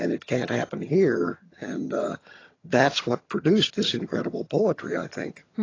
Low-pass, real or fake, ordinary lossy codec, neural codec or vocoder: 7.2 kHz; fake; AAC, 32 kbps; vocoder, 22.05 kHz, 80 mel bands, HiFi-GAN